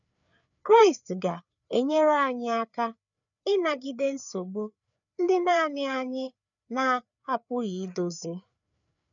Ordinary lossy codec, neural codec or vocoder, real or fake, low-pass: none; codec, 16 kHz, 4 kbps, FreqCodec, larger model; fake; 7.2 kHz